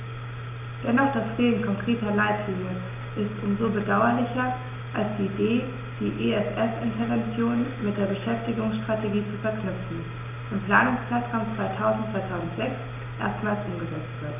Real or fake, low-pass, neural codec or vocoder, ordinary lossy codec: real; 3.6 kHz; none; none